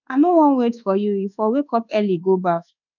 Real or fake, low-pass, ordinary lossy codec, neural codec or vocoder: fake; 7.2 kHz; none; codec, 24 kHz, 1.2 kbps, DualCodec